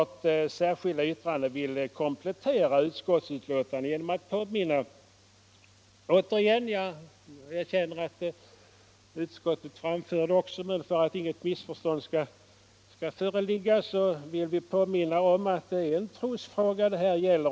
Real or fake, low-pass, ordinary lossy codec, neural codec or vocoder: real; none; none; none